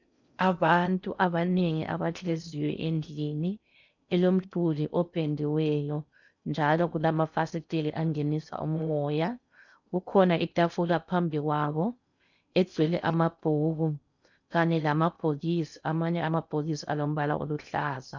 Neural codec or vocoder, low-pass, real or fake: codec, 16 kHz in and 24 kHz out, 0.6 kbps, FocalCodec, streaming, 4096 codes; 7.2 kHz; fake